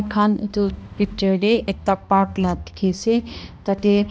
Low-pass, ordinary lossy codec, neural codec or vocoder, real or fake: none; none; codec, 16 kHz, 1 kbps, X-Codec, HuBERT features, trained on balanced general audio; fake